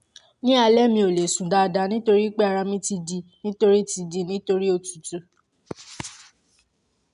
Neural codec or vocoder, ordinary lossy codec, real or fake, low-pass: none; none; real; 10.8 kHz